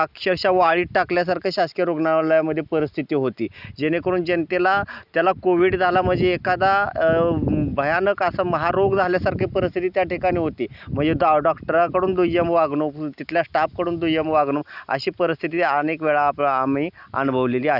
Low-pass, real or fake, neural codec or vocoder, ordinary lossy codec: 5.4 kHz; real; none; none